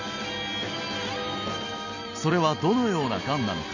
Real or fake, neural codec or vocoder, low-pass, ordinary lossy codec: real; none; 7.2 kHz; none